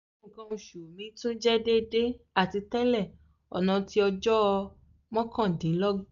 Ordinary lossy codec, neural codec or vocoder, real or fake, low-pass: none; none; real; 7.2 kHz